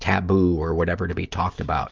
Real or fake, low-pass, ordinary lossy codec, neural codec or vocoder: real; 7.2 kHz; Opus, 24 kbps; none